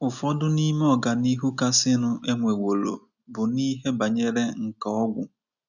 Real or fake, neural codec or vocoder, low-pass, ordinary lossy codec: real; none; 7.2 kHz; none